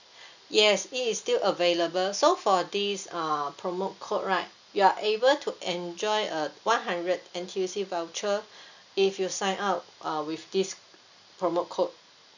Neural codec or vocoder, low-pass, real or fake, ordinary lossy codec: none; 7.2 kHz; real; none